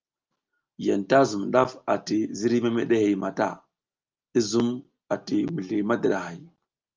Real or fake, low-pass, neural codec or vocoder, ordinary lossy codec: real; 7.2 kHz; none; Opus, 24 kbps